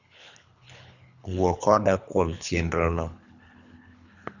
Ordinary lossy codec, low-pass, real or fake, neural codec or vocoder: none; 7.2 kHz; fake; codec, 24 kHz, 3 kbps, HILCodec